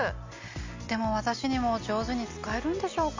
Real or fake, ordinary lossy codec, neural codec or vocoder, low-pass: real; MP3, 64 kbps; none; 7.2 kHz